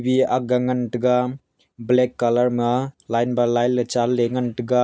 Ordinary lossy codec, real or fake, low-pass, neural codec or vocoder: none; real; none; none